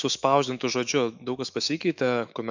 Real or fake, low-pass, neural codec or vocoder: fake; 7.2 kHz; autoencoder, 48 kHz, 128 numbers a frame, DAC-VAE, trained on Japanese speech